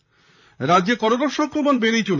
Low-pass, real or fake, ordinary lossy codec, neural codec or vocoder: 7.2 kHz; fake; none; vocoder, 44.1 kHz, 128 mel bands, Pupu-Vocoder